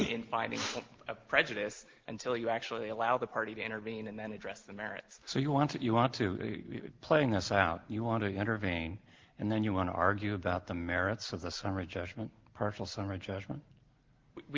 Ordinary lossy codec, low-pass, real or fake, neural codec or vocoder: Opus, 16 kbps; 7.2 kHz; real; none